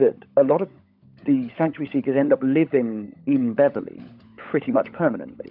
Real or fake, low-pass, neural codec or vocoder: fake; 5.4 kHz; codec, 16 kHz, 16 kbps, FunCodec, trained on LibriTTS, 50 frames a second